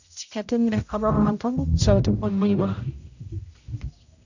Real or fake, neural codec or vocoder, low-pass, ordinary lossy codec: fake; codec, 16 kHz, 0.5 kbps, X-Codec, HuBERT features, trained on general audio; 7.2 kHz; none